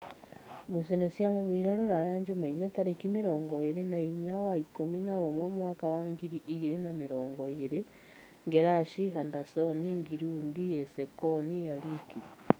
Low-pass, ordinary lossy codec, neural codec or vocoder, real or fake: none; none; codec, 44.1 kHz, 2.6 kbps, SNAC; fake